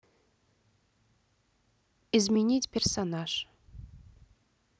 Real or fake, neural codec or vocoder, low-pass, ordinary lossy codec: real; none; none; none